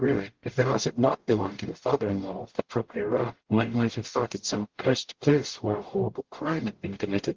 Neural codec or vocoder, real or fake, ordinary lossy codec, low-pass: codec, 44.1 kHz, 0.9 kbps, DAC; fake; Opus, 16 kbps; 7.2 kHz